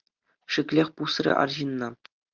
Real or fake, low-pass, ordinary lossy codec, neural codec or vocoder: real; 7.2 kHz; Opus, 32 kbps; none